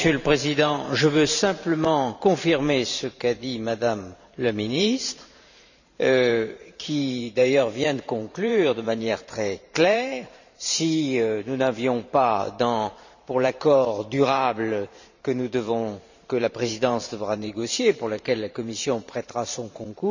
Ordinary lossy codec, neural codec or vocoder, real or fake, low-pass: none; vocoder, 44.1 kHz, 128 mel bands every 512 samples, BigVGAN v2; fake; 7.2 kHz